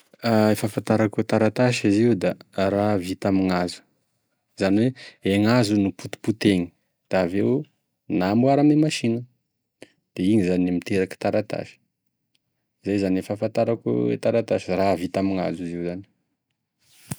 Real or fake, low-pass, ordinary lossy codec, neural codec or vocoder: real; none; none; none